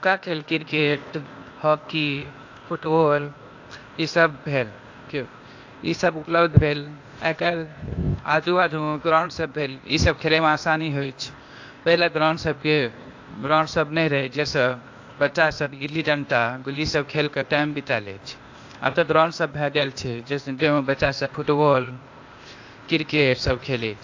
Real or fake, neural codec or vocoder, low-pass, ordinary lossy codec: fake; codec, 16 kHz, 0.8 kbps, ZipCodec; 7.2 kHz; AAC, 48 kbps